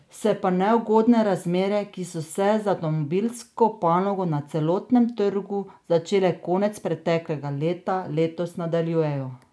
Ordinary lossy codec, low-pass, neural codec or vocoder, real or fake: none; none; none; real